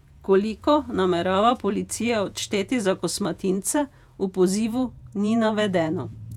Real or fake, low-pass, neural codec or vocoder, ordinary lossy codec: fake; 19.8 kHz; vocoder, 48 kHz, 128 mel bands, Vocos; none